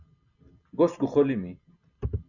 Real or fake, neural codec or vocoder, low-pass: real; none; 7.2 kHz